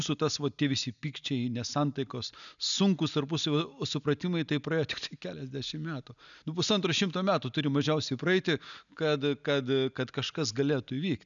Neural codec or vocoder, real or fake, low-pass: none; real; 7.2 kHz